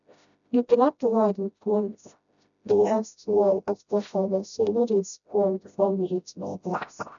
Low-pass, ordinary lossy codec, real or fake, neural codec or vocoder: 7.2 kHz; none; fake; codec, 16 kHz, 0.5 kbps, FreqCodec, smaller model